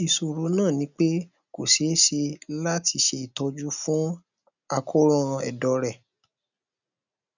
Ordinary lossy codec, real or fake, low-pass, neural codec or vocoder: none; real; 7.2 kHz; none